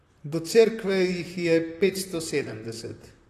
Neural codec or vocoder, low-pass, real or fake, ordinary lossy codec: vocoder, 44.1 kHz, 128 mel bands, Pupu-Vocoder; 14.4 kHz; fake; AAC, 48 kbps